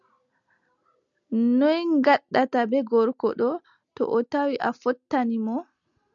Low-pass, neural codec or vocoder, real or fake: 7.2 kHz; none; real